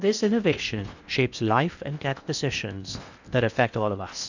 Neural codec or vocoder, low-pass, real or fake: codec, 16 kHz in and 24 kHz out, 0.8 kbps, FocalCodec, streaming, 65536 codes; 7.2 kHz; fake